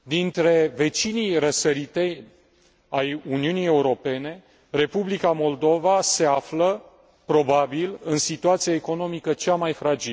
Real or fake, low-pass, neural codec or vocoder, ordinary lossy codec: real; none; none; none